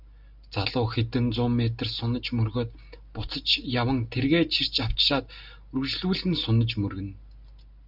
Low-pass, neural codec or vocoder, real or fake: 5.4 kHz; none; real